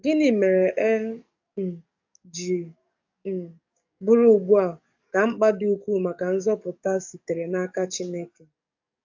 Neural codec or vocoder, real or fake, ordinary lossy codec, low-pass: codec, 44.1 kHz, 7.8 kbps, DAC; fake; AAC, 48 kbps; 7.2 kHz